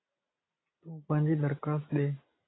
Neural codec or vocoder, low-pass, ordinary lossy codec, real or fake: none; 7.2 kHz; AAC, 16 kbps; real